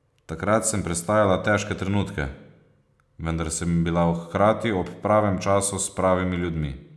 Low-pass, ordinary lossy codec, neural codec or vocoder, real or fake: none; none; none; real